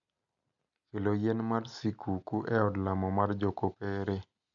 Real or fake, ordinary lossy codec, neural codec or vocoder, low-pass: real; none; none; 7.2 kHz